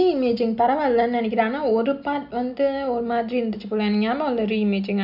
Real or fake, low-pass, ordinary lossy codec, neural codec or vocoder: real; 5.4 kHz; none; none